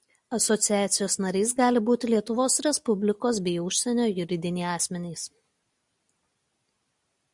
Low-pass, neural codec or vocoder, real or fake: 10.8 kHz; none; real